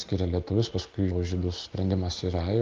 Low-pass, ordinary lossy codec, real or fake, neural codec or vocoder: 7.2 kHz; Opus, 32 kbps; real; none